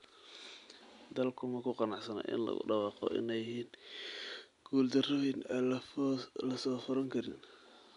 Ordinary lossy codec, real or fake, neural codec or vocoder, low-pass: none; real; none; 10.8 kHz